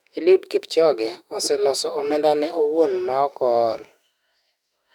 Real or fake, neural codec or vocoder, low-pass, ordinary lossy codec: fake; autoencoder, 48 kHz, 32 numbers a frame, DAC-VAE, trained on Japanese speech; 19.8 kHz; none